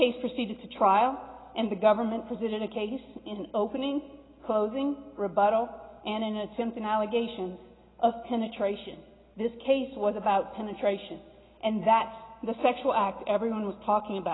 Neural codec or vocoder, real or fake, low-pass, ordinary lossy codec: none; real; 7.2 kHz; AAC, 16 kbps